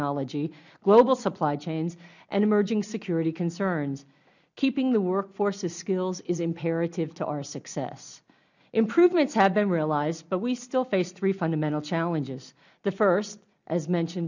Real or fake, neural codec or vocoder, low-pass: real; none; 7.2 kHz